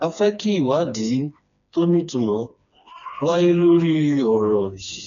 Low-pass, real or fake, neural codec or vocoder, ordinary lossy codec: 7.2 kHz; fake; codec, 16 kHz, 2 kbps, FreqCodec, smaller model; none